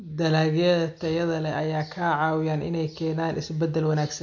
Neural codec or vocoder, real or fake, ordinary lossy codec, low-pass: none; real; MP3, 48 kbps; 7.2 kHz